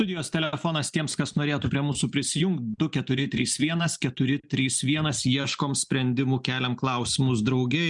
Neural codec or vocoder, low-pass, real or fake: none; 10.8 kHz; real